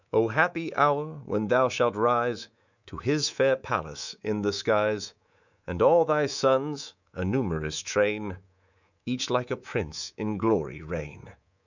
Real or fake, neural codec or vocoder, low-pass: fake; codec, 24 kHz, 3.1 kbps, DualCodec; 7.2 kHz